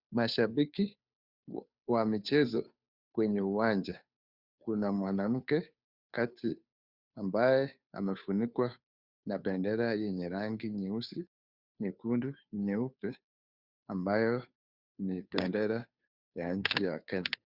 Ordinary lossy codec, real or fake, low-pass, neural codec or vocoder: Opus, 64 kbps; fake; 5.4 kHz; codec, 16 kHz, 2 kbps, FunCodec, trained on Chinese and English, 25 frames a second